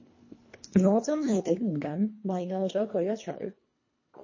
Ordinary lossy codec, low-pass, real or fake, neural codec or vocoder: MP3, 32 kbps; 7.2 kHz; fake; codec, 24 kHz, 1.5 kbps, HILCodec